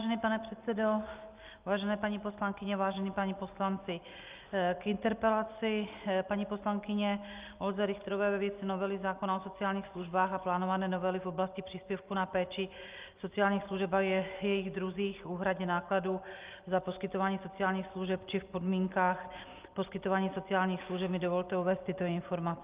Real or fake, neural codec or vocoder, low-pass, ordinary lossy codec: real; none; 3.6 kHz; Opus, 24 kbps